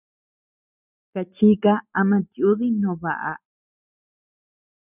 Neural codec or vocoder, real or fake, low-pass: none; real; 3.6 kHz